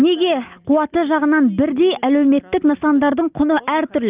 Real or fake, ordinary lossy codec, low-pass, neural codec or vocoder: real; Opus, 24 kbps; 3.6 kHz; none